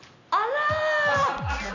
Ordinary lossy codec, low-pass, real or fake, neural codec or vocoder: none; 7.2 kHz; real; none